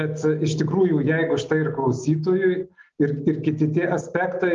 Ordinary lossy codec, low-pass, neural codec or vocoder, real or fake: Opus, 32 kbps; 7.2 kHz; none; real